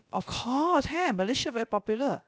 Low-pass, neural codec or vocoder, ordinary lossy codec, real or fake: none; codec, 16 kHz, about 1 kbps, DyCAST, with the encoder's durations; none; fake